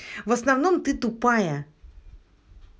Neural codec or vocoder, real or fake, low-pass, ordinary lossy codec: none; real; none; none